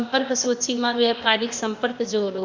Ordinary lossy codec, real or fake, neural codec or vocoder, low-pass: MP3, 64 kbps; fake; codec, 16 kHz, 0.8 kbps, ZipCodec; 7.2 kHz